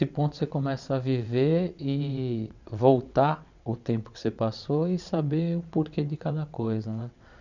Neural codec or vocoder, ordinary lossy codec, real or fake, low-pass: vocoder, 22.05 kHz, 80 mel bands, WaveNeXt; none; fake; 7.2 kHz